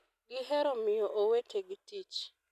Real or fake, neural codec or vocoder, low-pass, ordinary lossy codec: real; none; 14.4 kHz; none